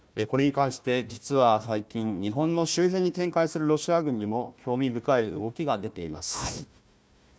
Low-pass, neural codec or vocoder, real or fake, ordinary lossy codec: none; codec, 16 kHz, 1 kbps, FunCodec, trained on Chinese and English, 50 frames a second; fake; none